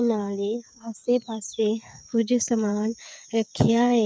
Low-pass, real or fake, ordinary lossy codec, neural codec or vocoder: none; fake; none; codec, 16 kHz, 8 kbps, FreqCodec, smaller model